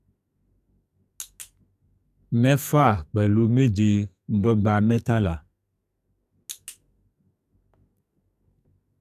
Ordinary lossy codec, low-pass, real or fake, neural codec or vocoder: none; 14.4 kHz; fake; codec, 44.1 kHz, 2.6 kbps, SNAC